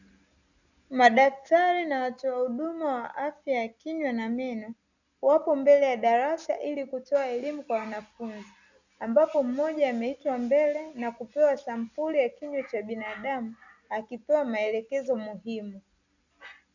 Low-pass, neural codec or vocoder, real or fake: 7.2 kHz; none; real